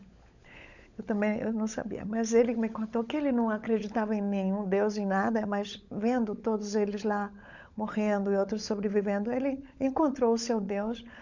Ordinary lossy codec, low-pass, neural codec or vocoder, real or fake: none; 7.2 kHz; codec, 16 kHz, 16 kbps, FunCodec, trained on LibriTTS, 50 frames a second; fake